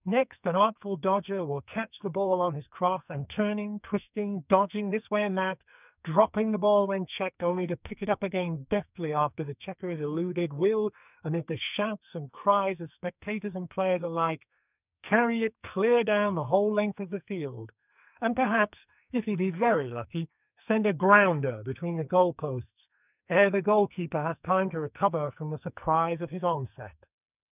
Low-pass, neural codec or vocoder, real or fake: 3.6 kHz; codec, 44.1 kHz, 2.6 kbps, SNAC; fake